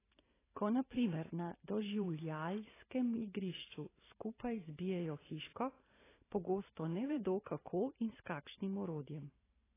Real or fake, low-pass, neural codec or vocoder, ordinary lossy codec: fake; 3.6 kHz; vocoder, 44.1 kHz, 128 mel bands every 512 samples, BigVGAN v2; AAC, 16 kbps